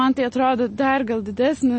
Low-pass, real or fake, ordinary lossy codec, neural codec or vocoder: 9.9 kHz; real; MP3, 32 kbps; none